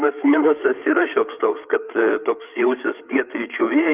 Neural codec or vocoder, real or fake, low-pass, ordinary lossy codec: codec, 16 kHz, 8 kbps, FreqCodec, larger model; fake; 3.6 kHz; Opus, 24 kbps